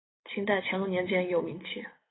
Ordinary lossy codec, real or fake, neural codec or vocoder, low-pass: AAC, 16 kbps; real; none; 7.2 kHz